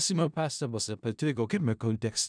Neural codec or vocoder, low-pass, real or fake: codec, 16 kHz in and 24 kHz out, 0.4 kbps, LongCat-Audio-Codec, four codebook decoder; 9.9 kHz; fake